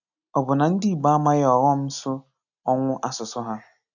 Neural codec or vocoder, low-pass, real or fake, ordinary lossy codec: none; 7.2 kHz; real; none